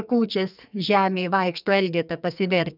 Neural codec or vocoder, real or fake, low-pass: codec, 44.1 kHz, 2.6 kbps, SNAC; fake; 5.4 kHz